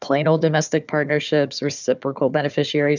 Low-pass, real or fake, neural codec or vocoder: 7.2 kHz; fake; codec, 16 kHz, 4 kbps, FunCodec, trained on Chinese and English, 50 frames a second